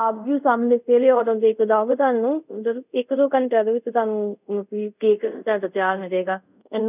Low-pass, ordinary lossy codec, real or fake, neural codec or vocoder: 3.6 kHz; none; fake; codec, 24 kHz, 0.5 kbps, DualCodec